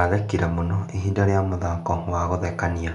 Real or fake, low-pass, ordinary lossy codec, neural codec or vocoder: real; 10.8 kHz; none; none